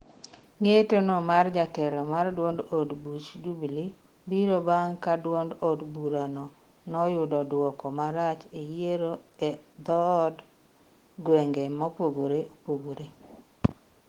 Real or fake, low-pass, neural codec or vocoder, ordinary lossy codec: fake; 19.8 kHz; autoencoder, 48 kHz, 128 numbers a frame, DAC-VAE, trained on Japanese speech; Opus, 16 kbps